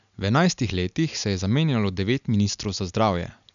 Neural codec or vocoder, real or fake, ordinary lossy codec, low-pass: none; real; none; 7.2 kHz